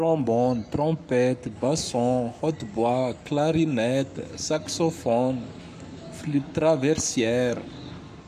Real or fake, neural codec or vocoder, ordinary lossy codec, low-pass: fake; codec, 44.1 kHz, 7.8 kbps, Pupu-Codec; none; 14.4 kHz